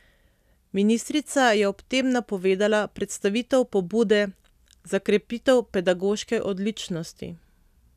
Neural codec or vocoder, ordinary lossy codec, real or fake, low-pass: none; none; real; 14.4 kHz